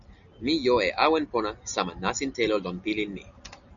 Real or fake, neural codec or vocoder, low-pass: real; none; 7.2 kHz